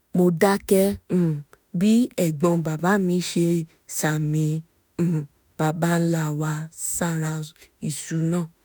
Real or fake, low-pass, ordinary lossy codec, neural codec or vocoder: fake; none; none; autoencoder, 48 kHz, 32 numbers a frame, DAC-VAE, trained on Japanese speech